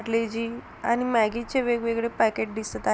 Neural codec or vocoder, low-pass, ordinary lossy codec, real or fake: none; none; none; real